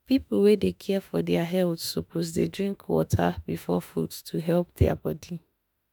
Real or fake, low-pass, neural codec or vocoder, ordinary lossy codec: fake; none; autoencoder, 48 kHz, 32 numbers a frame, DAC-VAE, trained on Japanese speech; none